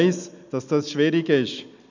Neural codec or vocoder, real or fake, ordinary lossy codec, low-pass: none; real; none; 7.2 kHz